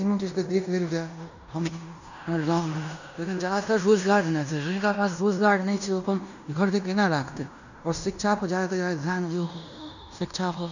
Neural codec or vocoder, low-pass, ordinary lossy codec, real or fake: codec, 16 kHz in and 24 kHz out, 0.9 kbps, LongCat-Audio-Codec, fine tuned four codebook decoder; 7.2 kHz; none; fake